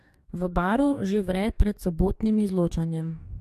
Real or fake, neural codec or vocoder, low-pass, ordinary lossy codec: fake; codec, 44.1 kHz, 2.6 kbps, DAC; 14.4 kHz; AAC, 96 kbps